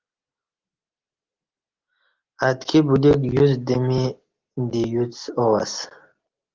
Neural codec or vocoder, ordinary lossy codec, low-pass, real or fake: none; Opus, 16 kbps; 7.2 kHz; real